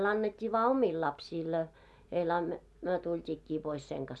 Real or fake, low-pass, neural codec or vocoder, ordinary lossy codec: real; none; none; none